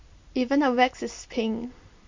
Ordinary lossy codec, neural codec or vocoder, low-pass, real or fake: MP3, 48 kbps; none; 7.2 kHz; real